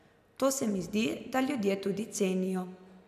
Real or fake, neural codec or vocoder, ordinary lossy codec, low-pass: real; none; none; 14.4 kHz